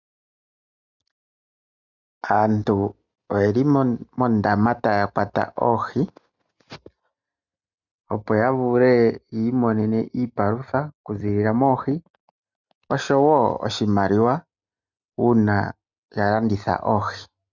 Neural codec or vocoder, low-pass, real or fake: none; 7.2 kHz; real